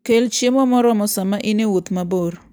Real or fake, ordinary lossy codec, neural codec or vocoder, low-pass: real; none; none; none